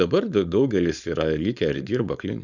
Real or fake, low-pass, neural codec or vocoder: fake; 7.2 kHz; codec, 16 kHz, 4.8 kbps, FACodec